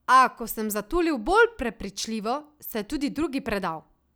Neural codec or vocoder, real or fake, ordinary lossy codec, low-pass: none; real; none; none